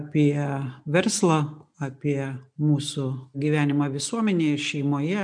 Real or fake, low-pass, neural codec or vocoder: real; 9.9 kHz; none